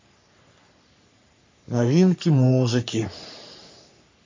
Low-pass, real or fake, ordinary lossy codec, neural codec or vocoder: 7.2 kHz; fake; MP3, 48 kbps; codec, 44.1 kHz, 3.4 kbps, Pupu-Codec